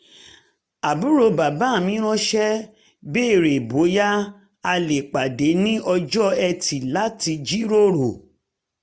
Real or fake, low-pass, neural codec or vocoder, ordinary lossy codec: real; none; none; none